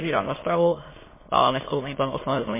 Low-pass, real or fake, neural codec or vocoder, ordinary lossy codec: 3.6 kHz; fake; autoencoder, 22.05 kHz, a latent of 192 numbers a frame, VITS, trained on many speakers; MP3, 16 kbps